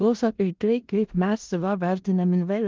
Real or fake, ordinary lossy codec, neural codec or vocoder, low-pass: fake; Opus, 24 kbps; codec, 16 kHz in and 24 kHz out, 0.4 kbps, LongCat-Audio-Codec, four codebook decoder; 7.2 kHz